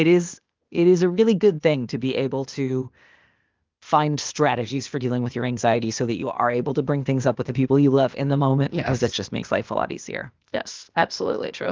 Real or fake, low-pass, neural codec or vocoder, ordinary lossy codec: fake; 7.2 kHz; codec, 16 kHz, 0.8 kbps, ZipCodec; Opus, 24 kbps